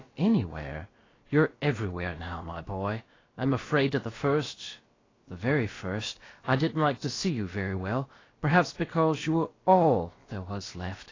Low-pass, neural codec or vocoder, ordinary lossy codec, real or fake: 7.2 kHz; codec, 16 kHz, about 1 kbps, DyCAST, with the encoder's durations; AAC, 32 kbps; fake